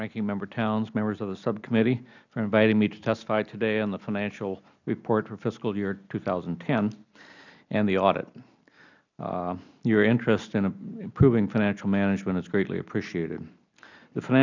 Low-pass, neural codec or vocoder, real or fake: 7.2 kHz; none; real